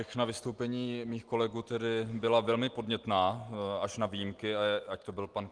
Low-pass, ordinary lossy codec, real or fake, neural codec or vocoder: 9.9 kHz; Opus, 24 kbps; real; none